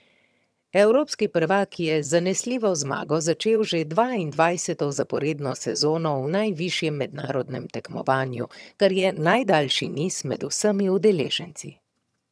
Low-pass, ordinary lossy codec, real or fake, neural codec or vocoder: none; none; fake; vocoder, 22.05 kHz, 80 mel bands, HiFi-GAN